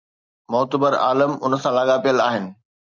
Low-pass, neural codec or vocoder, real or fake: 7.2 kHz; none; real